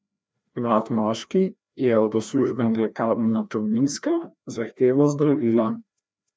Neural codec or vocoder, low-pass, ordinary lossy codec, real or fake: codec, 16 kHz, 1 kbps, FreqCodec, larger model; none; none; fake